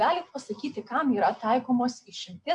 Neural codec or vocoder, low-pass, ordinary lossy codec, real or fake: none; 10.8 kHz; MP3, 64 kbps; real